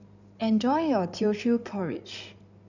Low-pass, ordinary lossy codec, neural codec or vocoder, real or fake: 7.2 kHz; none; codec, 16 kHz in and 24 kHz out, 2.2 kbps, FireRedTTS-2 codec; fake